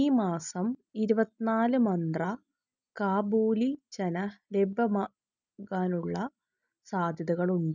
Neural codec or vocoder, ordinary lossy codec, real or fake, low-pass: none; none; real; 7.2 kHz